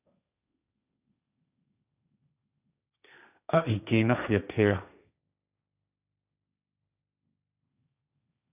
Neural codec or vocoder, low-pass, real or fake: codec, 16 kHz, 1.1 kbps, Voila-Tokenizer; 3.6 kHz; fake